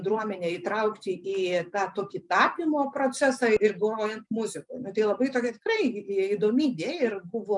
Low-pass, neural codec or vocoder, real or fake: 10.8 kHz; none; real